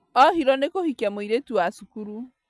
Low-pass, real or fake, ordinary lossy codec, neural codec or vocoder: none; real; none; none